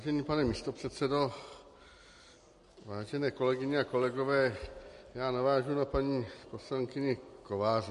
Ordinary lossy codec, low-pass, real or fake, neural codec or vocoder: MP3, 48 kbps; 14.4 kHz; real; none